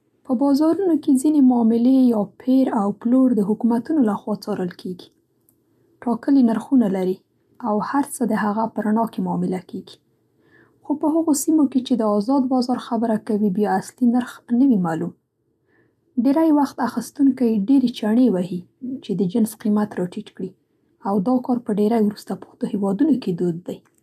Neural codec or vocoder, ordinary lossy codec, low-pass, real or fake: none; none; 14.4 kHz; real